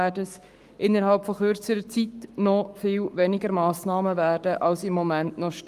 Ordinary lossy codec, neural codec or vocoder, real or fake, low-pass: Opus, 32 kbps; codec, 44.1 kHz, 7.8 kbps, Pupu-Codec; fake; 14.4 kHz